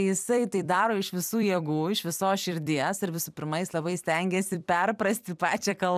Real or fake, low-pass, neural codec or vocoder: fake; 14.4 kHz; vocoder, 44.1 kHz, 128 mel bands every 256 samples, BigVGAN v2